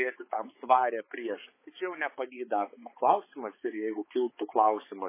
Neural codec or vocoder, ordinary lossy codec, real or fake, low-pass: codec, 16 kHz, 4 kbps, X-Codec, HuBERT features, trained on balanced general audio; MP3, 16 kbps; fake; 3.6 kHz